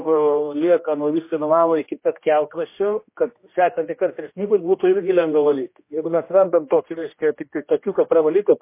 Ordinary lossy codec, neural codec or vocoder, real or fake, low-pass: MP3, 24 kbps; codec, 16 kHz, 1 kbps, X-Codec, HuBERT features, trained on general audio; fake; 3.6 kHz